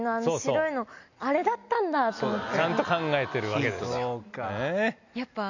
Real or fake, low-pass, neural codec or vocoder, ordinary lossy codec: real; 7.2 kHz; none; none